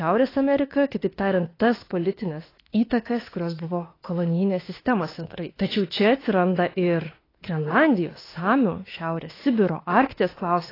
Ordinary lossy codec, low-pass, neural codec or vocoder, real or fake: AAC, 24 kbps; 5.4 kHz; autoencoder, 48 kHz, 32 numbers a frame, DAC-VAE, trained on Japanese speech; fake